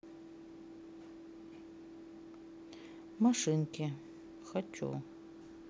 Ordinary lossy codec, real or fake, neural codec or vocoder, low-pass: none; real; none; none